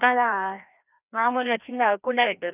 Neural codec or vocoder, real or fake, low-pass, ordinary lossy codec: codec, 16 kHz, 1 kbps, FreqCodec, larger model; fake; 3.6 kHz; none